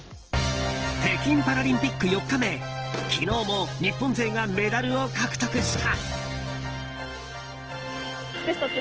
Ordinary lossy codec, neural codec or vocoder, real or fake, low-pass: Opus, 16 kbps; none; real; 7.2 kHz